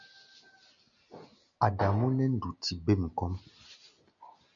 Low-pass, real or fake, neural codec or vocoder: 7.2 kHz; real; none